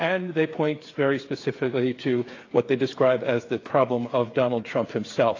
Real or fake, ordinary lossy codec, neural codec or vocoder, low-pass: fake; AAC, 32 kbps; codec, 16 kHz, 8 kbps, FreqCodec, smaller model; 7.2 kHz